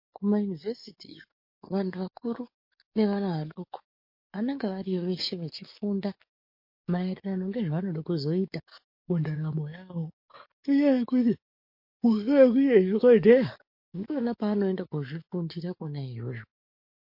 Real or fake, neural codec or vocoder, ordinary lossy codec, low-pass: fake; codec, 44.1 kHz, 7.8 kbps, DAC; MP3, 32 kbps; 5.4 kHz